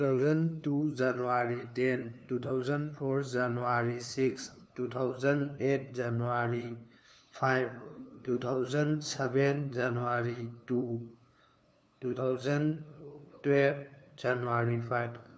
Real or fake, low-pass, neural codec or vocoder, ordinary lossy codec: fake; none; codec, 16 kHz, 2 kbps, FunCodec, trained on LibriTTS, 25 frames a second; none